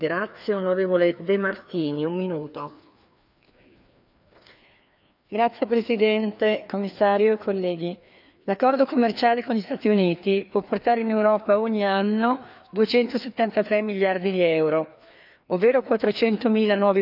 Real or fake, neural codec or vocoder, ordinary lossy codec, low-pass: fake; codec, 16 kHz, 2 kbps, FreqCodec, larger model; none; 5.4 kHz